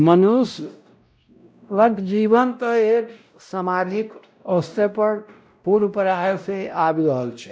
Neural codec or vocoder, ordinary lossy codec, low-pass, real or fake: codec, 16 kHz, 0.5 kbps, X-Codec, WavLM features, trained on Multilingual LibriSpeech; none; none; fake